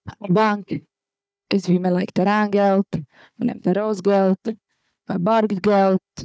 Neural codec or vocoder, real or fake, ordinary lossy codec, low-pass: codec, 16 kHz, 4 kbps, FunCodec, trained on Chinese and English, 50 frames a second; fake; none; none